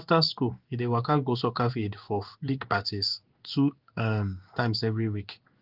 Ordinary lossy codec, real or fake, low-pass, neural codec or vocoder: Opus, 32 kbps; fake; 5.4 kHz; codec, 16 kHz in and 24 kHz out, 1 kbps, XY-Tokenizer